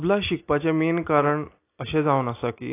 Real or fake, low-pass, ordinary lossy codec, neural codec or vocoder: real; 3.6 kHz; AAC, 24 kbps; none